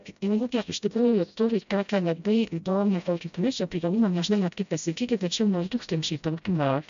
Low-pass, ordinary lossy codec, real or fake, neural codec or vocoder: 7.2 kHz; AAC, 96 kbps; fake; codec, 16 kHz, 0.5 kbps, FreqCodec, smaller model